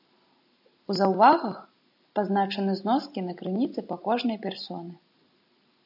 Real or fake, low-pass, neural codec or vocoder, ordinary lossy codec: real; 5.4 kHz; none; AAC, 48 kbps